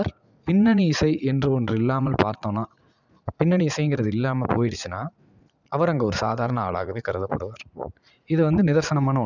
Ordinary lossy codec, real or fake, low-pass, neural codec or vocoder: none; fake; 7.2 kHz; vocoder, 44.1 kHz, 80 mel bands, Vocos